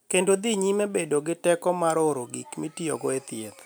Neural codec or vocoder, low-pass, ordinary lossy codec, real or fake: none; none; none; real